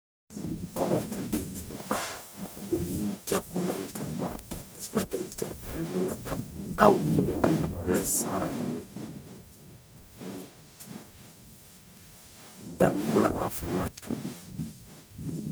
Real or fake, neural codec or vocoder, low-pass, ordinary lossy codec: fake; codec, 44.1 kHz, 0.9 kbps, DAC; none; none